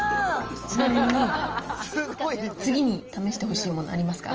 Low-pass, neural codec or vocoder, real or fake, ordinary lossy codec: 7.2 kHz; none; real; Opus, 24 kbps